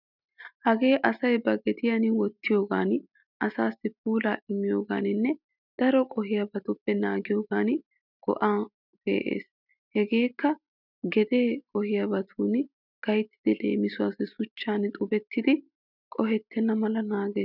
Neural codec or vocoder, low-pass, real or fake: none; 5.4 kHz; real